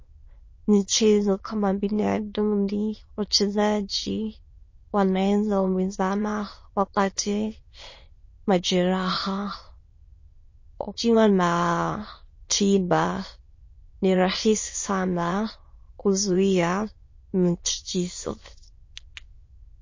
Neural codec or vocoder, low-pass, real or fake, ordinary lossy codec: autoencoder, 22.05 kHz, a latent of 192 numbers a frame, VITS, trained on many speakers; 7.2 kHz; fake; MP3, 32 kbps